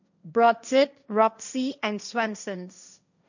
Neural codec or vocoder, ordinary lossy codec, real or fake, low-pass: codec, 16 kHz, 1.1 kbps, Voila-Tokenizer; none; fake; 7.2 kHz